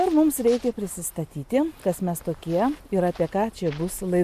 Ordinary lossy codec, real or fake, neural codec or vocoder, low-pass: MP3, 96 kbps; real; none; 14.4 kHz